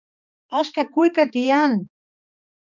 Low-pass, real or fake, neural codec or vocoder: 7.2 kHz; fake; codec, 16 kHz, 2 kbps, X-Codec, HuBERT features, trained on balanced general audio